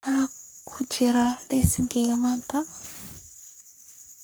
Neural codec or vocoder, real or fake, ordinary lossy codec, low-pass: codec, 44.1 kHz, 3.4 kbps, Pupu-Codec; fake; none; none